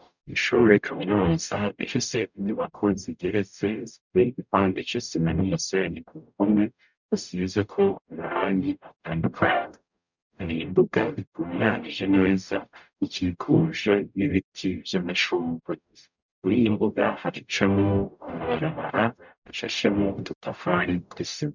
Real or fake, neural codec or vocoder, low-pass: fake; codec, 44.1 kHz, 0.9 kbps, DAC; 7.2 kHz